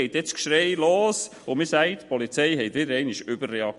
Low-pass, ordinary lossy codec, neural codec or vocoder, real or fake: 14.4 kHz; MP3, 48 kbps; none; real